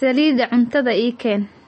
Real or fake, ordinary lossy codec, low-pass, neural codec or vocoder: real; MP3, 32 kbps; 9.9 kHz; none